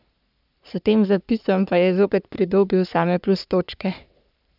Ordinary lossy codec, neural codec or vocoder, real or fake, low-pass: none; codec, 44.1 kHz, 3.4 kbps, Pupu-Codec; fake; 5.4 kHz